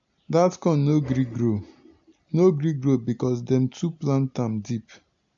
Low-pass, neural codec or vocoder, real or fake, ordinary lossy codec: 7.2 kHz; none; real; AAC, 64 kbps